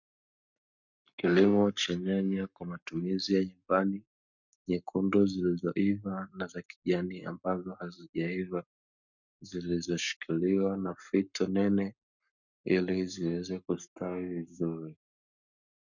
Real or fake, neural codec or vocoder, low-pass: fake; codec, 44.1 kHz, 7.8 kbps, Pupu-Codec; 7.2 kHz